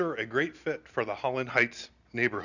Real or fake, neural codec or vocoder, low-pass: real; none; 7.2 kHz